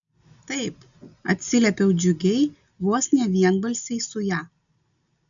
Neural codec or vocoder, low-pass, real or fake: none; 7.2 kHz; real